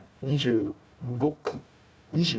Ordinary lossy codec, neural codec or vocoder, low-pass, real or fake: none; codec, 16 kHz, 1 kbps, FunCodec, trained on Chinese and English, 50 frames a second; none; fake